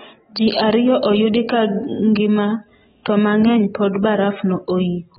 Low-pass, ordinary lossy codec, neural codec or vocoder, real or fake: 7.2 kHz; AAC, 16 kbps; none; real